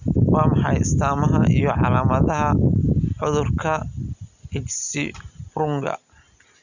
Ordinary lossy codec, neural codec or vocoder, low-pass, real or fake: none; none; 7.2 kHz; real